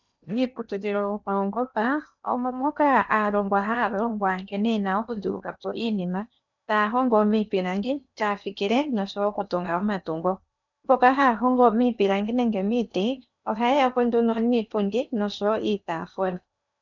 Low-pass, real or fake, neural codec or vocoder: 7.2 kHz; fake; codec, 16 kHz in and 24 kHz out, 0.8 kbps, FocalCodec, streaming, 65536 codes